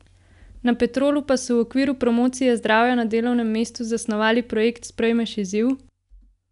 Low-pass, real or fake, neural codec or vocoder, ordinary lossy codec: 10.8 kHz; real; none; none